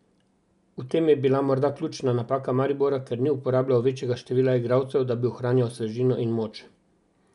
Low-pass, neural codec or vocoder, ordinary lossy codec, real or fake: 10.8 kHz; none; none; real